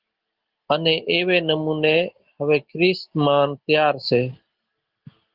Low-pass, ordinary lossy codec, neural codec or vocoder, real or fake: 5.4 kHz; Opus, 16 kbps; none; real